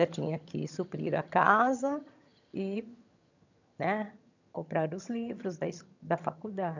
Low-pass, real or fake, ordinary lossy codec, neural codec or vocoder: 7.2 kHz; fake; none; vocoder, 22.05 kHz, 80 mel bands, HiFi-GAN